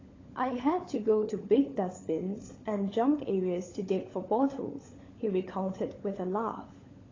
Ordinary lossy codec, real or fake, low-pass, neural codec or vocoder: AAC, 32 kbps; fake; 7.2 kHz; codec, 16 kHz, 16 kbps, FunCodec, trained on LibriTTS, 50 frames a second